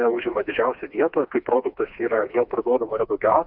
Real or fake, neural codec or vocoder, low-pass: fake; codec, 16 kHz, 2 kbps, FreqCodec, smaller model; 5.4 kHz